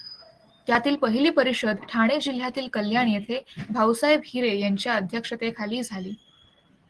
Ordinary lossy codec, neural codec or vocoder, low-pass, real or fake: Opus, 16 kbps; none; 10.8 kHz; real